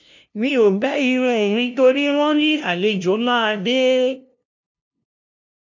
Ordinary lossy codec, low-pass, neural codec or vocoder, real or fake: none; 7.2 kHz; codec, 16 kHz, 0.5 kbps, FunCodec, trained on LibriTTS, 25 frames a second; fake